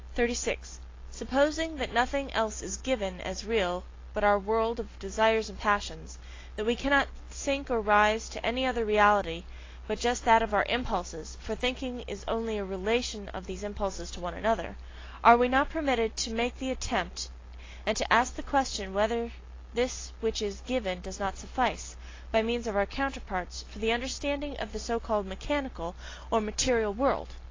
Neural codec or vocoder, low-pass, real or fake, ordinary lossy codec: none; 7.2 kHz; real; AAC, 32 kbps